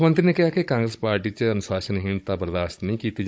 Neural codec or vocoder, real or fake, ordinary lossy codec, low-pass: codec, 16 kHz, 16 kbps, FunCodec, trained on Chinese and English, 50 frames a second; fake; none; none